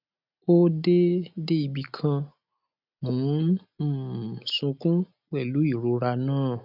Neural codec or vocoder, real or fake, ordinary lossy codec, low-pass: none; real; none; 5.4 kHz